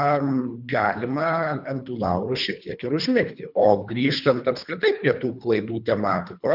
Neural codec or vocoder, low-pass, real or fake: codec, 24 kHz, 3 kbps, HILCodec; 5.4 kHz; fake